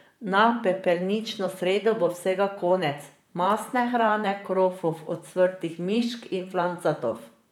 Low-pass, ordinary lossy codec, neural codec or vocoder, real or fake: 19.8 kHz; none; vocoder, 44.1 kHz, 128 mel bands, Pupu-Vocoder; fake